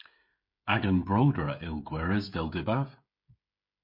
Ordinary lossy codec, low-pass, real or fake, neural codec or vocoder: MP3, 32 kbps; 5.4 kHz; fake; codec, 16 kHz, 16 kbps, FreqCodec, smaller model